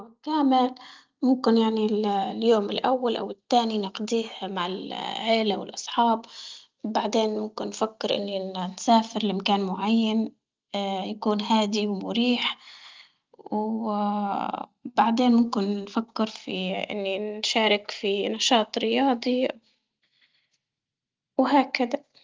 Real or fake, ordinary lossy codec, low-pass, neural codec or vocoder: real; Opus, 32 kbps; 7.2 kHz; none